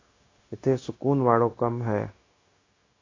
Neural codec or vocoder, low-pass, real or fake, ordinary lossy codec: codec, 16 kHz, 0.9 kbps, LongCat-Audio-Codec; 7.2 kHz; fake; AAC, 32 kbps